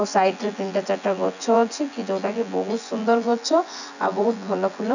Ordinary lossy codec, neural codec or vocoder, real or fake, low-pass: none; vocoder, 24 kHz, 100 mel bands, Vocos; fake; 7.2 kHz